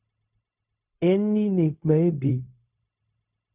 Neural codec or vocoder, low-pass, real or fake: codec, 16 kHz, 0.4 kbps, LongCat-Audio-Codec; 3.6 kHz; fake